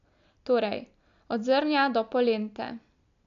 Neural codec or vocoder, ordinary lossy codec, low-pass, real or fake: none; none; 7.2 kHz; real